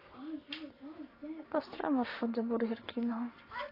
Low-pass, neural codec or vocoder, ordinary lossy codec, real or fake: 5.4 kHz; codec, 44.1 kHz, 7.8 kbps, Pupu-Codec; AAC, 24 kbps; fake